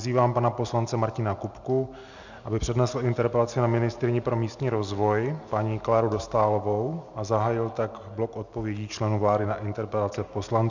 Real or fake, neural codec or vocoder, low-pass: real; none; 7.2 kHz